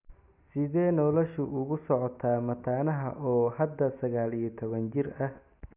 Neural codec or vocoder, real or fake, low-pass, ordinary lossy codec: none; real; 3.6 kHz; none